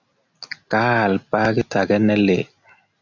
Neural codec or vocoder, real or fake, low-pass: none; real; 7.2 kHz